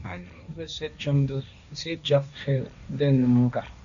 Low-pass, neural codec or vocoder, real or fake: 7.2 kHz; codec, 16 kHz, 1.1 kbps, Voila-Tokenizer; fake